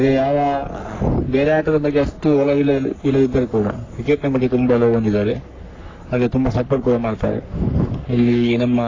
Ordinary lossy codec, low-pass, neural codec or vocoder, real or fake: AAC, 32 kbps; 7.2 kHz; codec, 44.1 kHz, 3.4 kbps, Pupu-Codec; fake